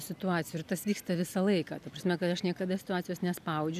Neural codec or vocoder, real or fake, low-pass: none; real; 14.4 kHz